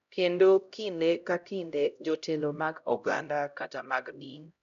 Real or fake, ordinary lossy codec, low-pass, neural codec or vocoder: fake; none; 7.2 kHz; codec, 16 kHz, 0.5 kbps, X-Codec, HuBERT features, trained on LibriSpeech